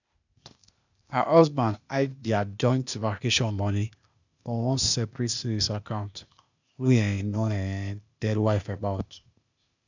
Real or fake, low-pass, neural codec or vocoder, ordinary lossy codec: fake; 7.2 kHz; codec, 16 kHz, 0.8 kbps, ZipCodec; none